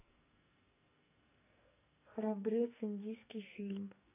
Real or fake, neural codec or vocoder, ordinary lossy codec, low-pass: fake; codec, 44.1 kHz, 2.6 kbps, SNAC; none; 3.6 kHz